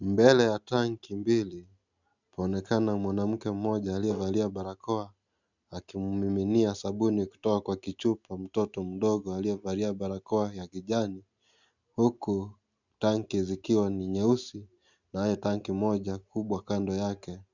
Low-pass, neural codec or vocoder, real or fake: 7.2 kHz; none; real